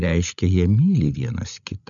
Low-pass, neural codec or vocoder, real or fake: 7.2 kHz; codec, 16 kHz, 16 kbps, FreqCodec, larger model; fake